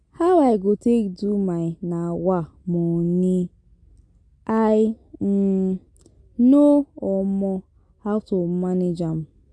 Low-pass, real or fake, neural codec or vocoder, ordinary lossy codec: 9.9 kHz; real; none; MP3, 48 kbps